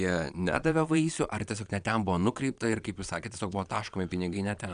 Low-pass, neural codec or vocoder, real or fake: 9.9 kHz; vocoder, 22.05 kHz, 80 mel bands, Vocos; fake